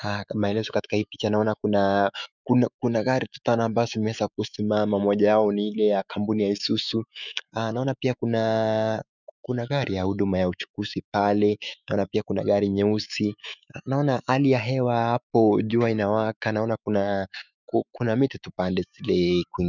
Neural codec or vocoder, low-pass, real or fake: autoencoder, 48 kHz, 128 numbers a frame, DAC-VAE, trained on Japanese speech; 7.2 kHz; fake